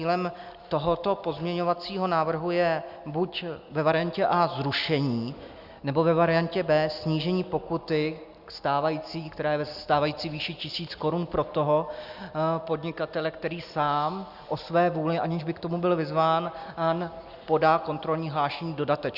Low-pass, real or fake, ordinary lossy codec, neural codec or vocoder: 5.4 kHz; real; Opus, 64 kbps; none